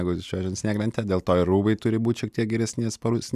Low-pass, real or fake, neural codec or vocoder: 14.4 kHz; real; none